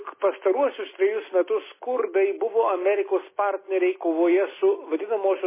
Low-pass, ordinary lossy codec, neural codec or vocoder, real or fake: 3.6 kHz; MP3, 16 kbps; none; real